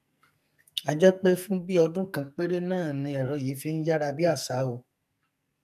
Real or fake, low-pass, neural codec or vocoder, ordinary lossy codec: fake; 14.4 kHz; codec, 44.1 kHz, 2.6 kbps, SNAC; none